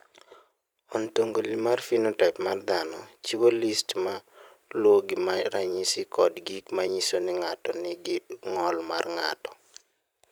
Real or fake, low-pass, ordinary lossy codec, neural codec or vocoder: fake; none; none; vocoder, 44.1 kHz, 128 mel bands every 512 samples, BigVGAN v2